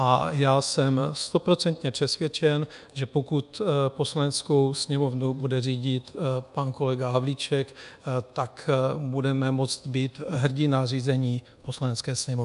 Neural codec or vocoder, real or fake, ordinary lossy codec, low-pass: codec, 24 kHz, 1.2 kbps, DualCodec; fake; AAC, 96 kbps; 10.8 kHz